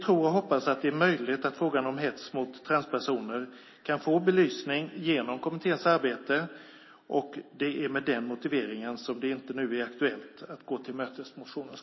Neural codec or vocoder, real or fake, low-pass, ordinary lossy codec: none; real; 7.2 kHz; MP3, 24 kbps